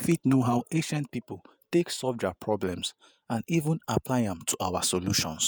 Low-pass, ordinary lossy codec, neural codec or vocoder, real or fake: none; none; vocoder, 48 kHz, 128 mel bands, Vocos; fake